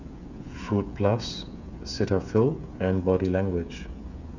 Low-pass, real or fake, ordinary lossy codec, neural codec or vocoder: 7.2 kHz; fake; none; codec, 16 kHz, 8 kbps, FreqCodec, smaller model